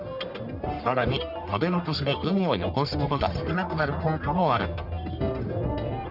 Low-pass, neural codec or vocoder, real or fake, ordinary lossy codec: 5.4 kHz; codec, 44.1 kHz, 1.7 kbps, Pupu-Codec; fake; Opus, 64 kbps